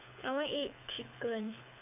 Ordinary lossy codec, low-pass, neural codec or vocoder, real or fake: none; 3.6 kHz; codec, 24 kHz, 6 kbps, HILCodec; fake